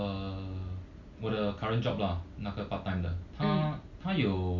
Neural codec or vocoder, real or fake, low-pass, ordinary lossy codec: none; real; 7.2 kHz; none